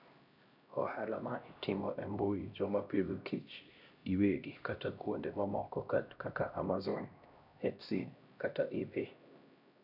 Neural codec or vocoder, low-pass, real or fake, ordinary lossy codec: codec, 16 kHz, 1 kbps, X-Codec, HuBERT features, trained on LibriSpeech; 5.4 kHz; fake; none